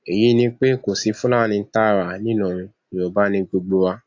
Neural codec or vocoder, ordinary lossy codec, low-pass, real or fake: none; AAC, 48 kbps; 7.2 kHz; real